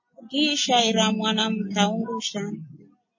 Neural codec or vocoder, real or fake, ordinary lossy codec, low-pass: none; real; MP3, 32 kbps; 7.2 kHz